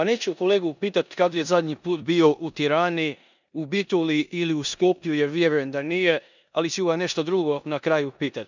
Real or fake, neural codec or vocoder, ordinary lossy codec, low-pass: fake; codec, 16 kHz in and 24 kHz out, 0.9 kbps, LongCat-Audio-Codec, four codebook decoder; none; 7.2 kHz